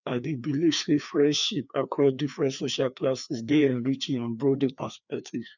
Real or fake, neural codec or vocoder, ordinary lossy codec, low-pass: fake; codec, 16 kHz, 2 kbps, FreqCodec, larger model; none; 7.2 kHz